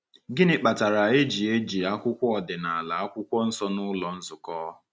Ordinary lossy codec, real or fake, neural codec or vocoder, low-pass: none; real; none; none